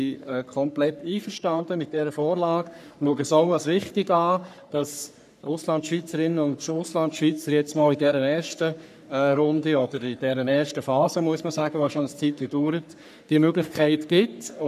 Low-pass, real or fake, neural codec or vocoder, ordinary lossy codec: 14.4 kHz; fake; codec, 44.1 kHz, 3.4 kbps, Pupu-Codec; none